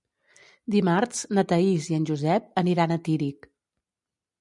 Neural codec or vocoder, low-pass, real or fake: none; 10.8 kHz; real